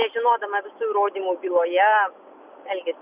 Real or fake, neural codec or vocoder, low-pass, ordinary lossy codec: real; none; 3.6 kHz; Opus, 64 kbps